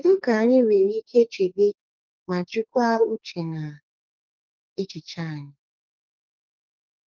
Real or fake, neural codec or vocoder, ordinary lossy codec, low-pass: fake; codec, 44.1 kHz, 2.6 kbps, SNAC; Opus, 32 kbps; 7.2 kHz